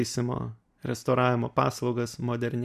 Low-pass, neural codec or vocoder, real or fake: 14.4 kHz; none; real